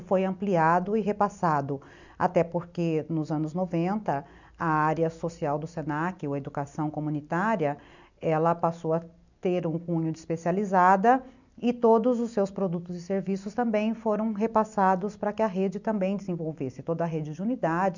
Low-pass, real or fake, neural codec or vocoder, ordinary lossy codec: 7.2 kHz; real; none; none